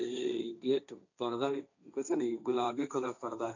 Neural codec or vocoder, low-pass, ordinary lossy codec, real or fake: codec, 16 kHz, 1.1 kbps, Voila-Tokenizer; 7.2 kHz; none; fake